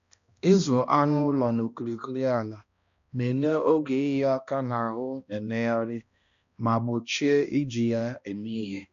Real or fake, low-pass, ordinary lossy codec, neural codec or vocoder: fake; 7.2 kHz; none; codec, 16 kHz, 1 kbps, X-Codec, HuBERT features, trained on balanced general audio